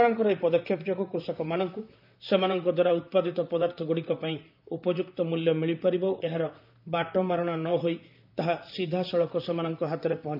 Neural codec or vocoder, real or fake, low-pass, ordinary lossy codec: vocoder, 44.1 kHz, 128 mel bands, Pupu-Vocoder; fake; 5.4 kHz; none